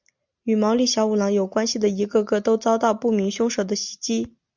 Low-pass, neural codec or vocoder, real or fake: 7.2 kHz; none; real